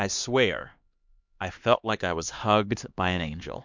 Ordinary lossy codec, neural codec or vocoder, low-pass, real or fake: MP3, 64 kbps; codec, 16 kHz, 2 kbps, X-Codec, HuBERT features, trained on LibriSpeech; 7.2 kHz; fake